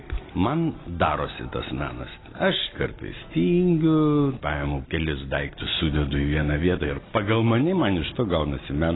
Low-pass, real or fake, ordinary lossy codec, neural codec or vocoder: 7.2 kHz; real; AAC, 16 kbps; none